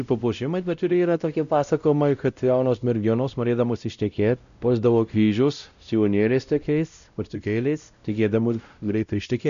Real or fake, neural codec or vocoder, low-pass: fake; codec, 16 kHz, 0.5 kbps, X-Codec, WavLM features, trained on Multilingual LibriSpeech; 7.2 kHz